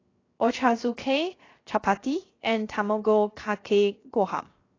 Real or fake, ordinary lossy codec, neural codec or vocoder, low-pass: fake; AAC, 32 kbps; codec, 16 kHz, 0.7 kbps, FocalCodec; 7.2 kHz